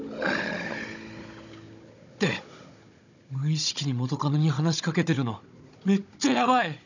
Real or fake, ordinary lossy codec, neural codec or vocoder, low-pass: fake; none; codec, 16 kHz, 16 kbps, FunCodec, trained on Chinese and English, 50 frames a second; 7.2 kHz